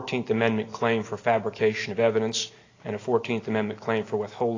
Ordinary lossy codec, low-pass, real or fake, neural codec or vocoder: AAC, 32 kbps; 7.2 kHz; real; none